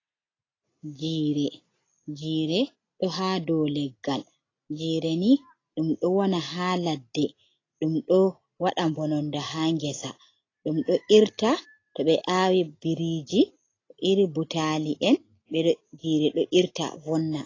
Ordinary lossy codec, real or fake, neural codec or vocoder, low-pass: AAC, 32 kbps; real; none; 7.2 kHz